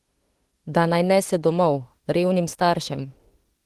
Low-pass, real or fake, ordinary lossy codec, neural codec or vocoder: 14.4 kHz; fake; Opus, 16 kbps; autoencoder, 48 kHz, 32 numbers a frame, DAC-VAE, trained on Japanese speech